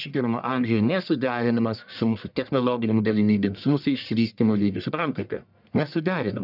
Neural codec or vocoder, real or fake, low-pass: codec, 44.1 kHz, 1.7 kbps, Pupu-Codec; fake; 5.4 kHz